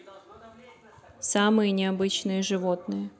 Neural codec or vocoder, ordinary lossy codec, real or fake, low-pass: none; none; real; none